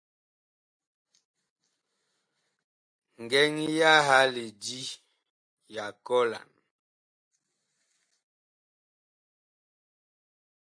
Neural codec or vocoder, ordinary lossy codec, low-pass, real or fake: none; AAC, 64 kbps; 9.9 kHz; real